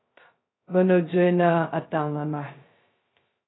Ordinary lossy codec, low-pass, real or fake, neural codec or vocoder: AAC, 16 kbps; 7.2 kHz; fake; codec, 16 kHz, 0.2 kbps, FocalCodec